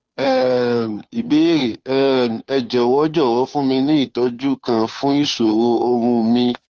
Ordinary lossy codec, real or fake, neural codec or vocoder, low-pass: none; fake; codec, 16 kHz, 2 kbps, FunCodec, trained on Chinese and English, 25 frames a second; none